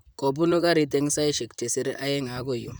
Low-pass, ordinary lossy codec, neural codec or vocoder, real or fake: none; none; vocoder, 44.1 kHz, 128 mel bands, Pupu-Vocoder; fake